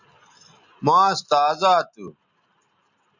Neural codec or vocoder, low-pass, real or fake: none; 7.2 kHz; real